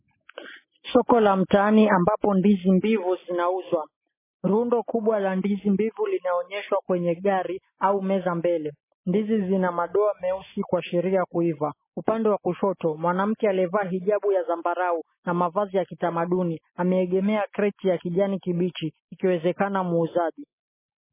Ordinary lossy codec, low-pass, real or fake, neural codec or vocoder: MP3, 16 kbps; 3.6 kHz; real; none